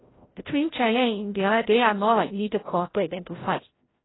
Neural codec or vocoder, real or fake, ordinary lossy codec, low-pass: codec, 16 kHz, 0.5 kbps, FreqCodec, larger model; fake; AAC, 16 kbps; 7.2 kHz